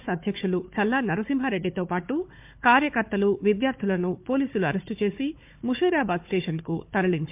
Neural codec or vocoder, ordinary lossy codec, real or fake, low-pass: codec, 16 kHz, 4 kbps, FunCodec, trained on Chinese and English, 50 frames a second; MP3, 32 kbps; fake; 3.6 kHz